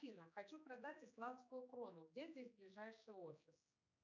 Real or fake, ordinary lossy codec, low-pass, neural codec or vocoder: fake; MP3, 64 kbps; 7.2 kHz; codec, 16 kHz, 2 kbps, X-Codec, HuBERT features, trained on general audio